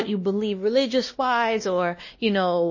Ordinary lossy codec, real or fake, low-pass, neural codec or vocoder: MP3, 32 kbps; fake; 7.2 kHz; codec, 16 kHz, 1 kbps, X-Codec, WavLM features, trained on Multilingual LibriSpeech